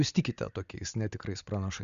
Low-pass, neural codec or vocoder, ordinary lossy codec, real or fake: 7.2 kHz; none; Opus, 64 kbps; real